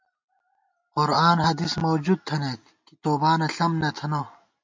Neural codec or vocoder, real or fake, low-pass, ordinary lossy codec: none; real; 7.2 kHz; MP3, 48 kbps